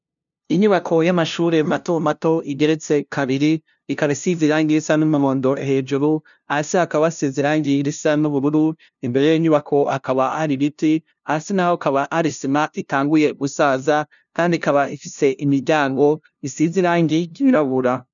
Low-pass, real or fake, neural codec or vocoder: 7.2 kHz; fake; codec, 16 kHz, 0.5 kbps, FunCodec, trained on LibriTTS, 25 frames a second